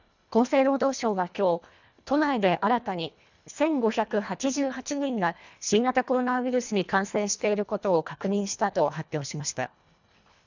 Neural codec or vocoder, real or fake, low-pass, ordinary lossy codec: codec, 24 kHz, 1.5 kbps, HILCodec; fake; 7.2 kHz; none